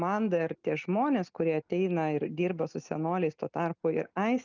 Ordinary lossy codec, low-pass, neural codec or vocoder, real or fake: Opus, 32 kbps; 7.2 kHz; none; real